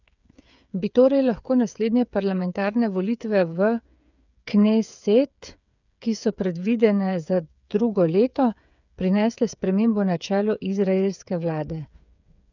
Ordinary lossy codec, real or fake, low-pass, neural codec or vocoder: none; fake; 7.2 kHz; codec, 16 kHz, 8 kbps, FreqCodec, smaller model